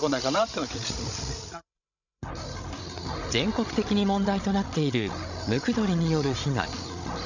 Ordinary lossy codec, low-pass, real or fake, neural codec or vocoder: none; 7.2 kHz; fake; codec, 16 kHz, 16 kbps, FreqCodec, larger model